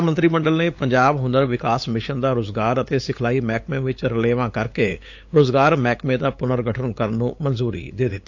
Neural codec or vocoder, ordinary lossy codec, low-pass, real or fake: codec, 16 kHz, 8 kbps, FunCodec, trained on LibriTTS, 25 frames a second; AAC, 48 kbps; 7.2 kHz; fake